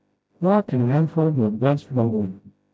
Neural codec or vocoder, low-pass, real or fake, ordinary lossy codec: codec, 16 kHz, 0.5 kbps, FreqCodec, smaller model; none; fake; none